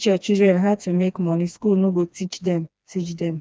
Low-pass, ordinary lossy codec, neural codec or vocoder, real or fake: none; none; codec, 16 kHz, 2 kbps, FreqCodec, smaller model; fake